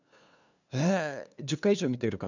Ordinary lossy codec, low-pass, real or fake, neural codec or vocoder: none; 7.2 kHz; fake; codec, 16 kHz, 2 kbps, FunCodec, trained on LibriTTS, 25 frames a second